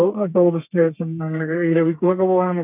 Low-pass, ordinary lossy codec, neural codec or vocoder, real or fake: 3.6 kHz; none; codec, 32 kHz, 1.9 kbps, SNAC; fake